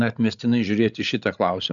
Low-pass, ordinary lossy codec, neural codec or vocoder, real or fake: 7.2 kHz; MP3, 96 kbps; codec, 16 kHz, 8 kbps, FreqCodec, larger model; fake